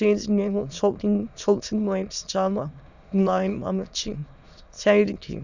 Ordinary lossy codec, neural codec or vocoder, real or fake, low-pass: none; autoencoder, 22.05 kHz, a latent of 192 numbers a frame, VITS, trained on many speakers; fake; 7.2 kHz